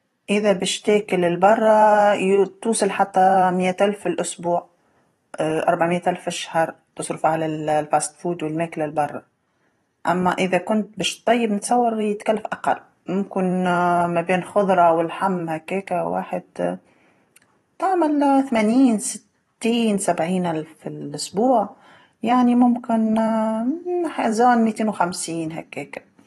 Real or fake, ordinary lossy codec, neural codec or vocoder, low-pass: fake; AAC, 32 kbps; vocoder, 44.1 kHz, 128 mel bands every 512 samples, BigVGAN v2; 19.8 kHz